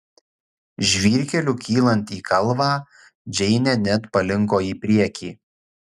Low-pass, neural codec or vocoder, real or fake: 14.4 kHz; none; real